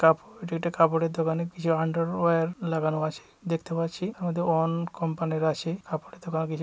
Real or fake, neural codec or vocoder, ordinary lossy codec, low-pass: real; none; none; none